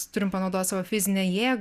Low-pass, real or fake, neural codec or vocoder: 14.4 kHz; real; none